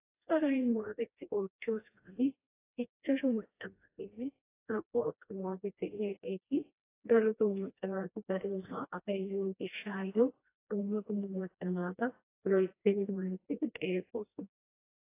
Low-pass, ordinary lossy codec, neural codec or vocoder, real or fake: 3.6 kHz; AAC, 24 kbps; codec, 16 kHz, 1 kbps, FreqCodec, smaller model; fake